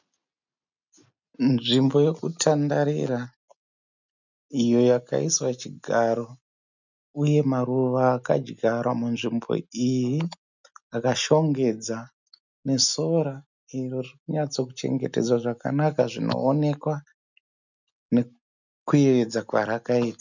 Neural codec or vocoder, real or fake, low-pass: none; real; 7.2 kHz